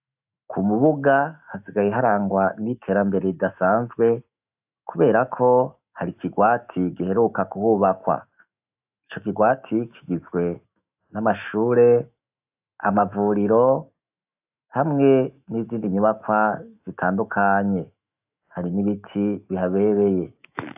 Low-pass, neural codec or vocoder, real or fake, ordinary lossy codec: 3.6 kHz; autoencoder, 48 kHz, 128 numbers a frame, DAC-VAE, trained on Japanese speech; fake; AAC, 32 kbps